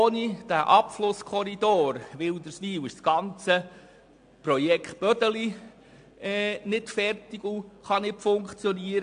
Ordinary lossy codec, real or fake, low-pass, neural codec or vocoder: AAC, 64 kbps; real; 9.9 kHz; none